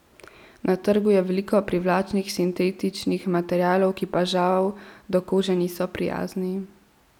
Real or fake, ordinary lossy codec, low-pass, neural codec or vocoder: real; none; 19.8 kHz; none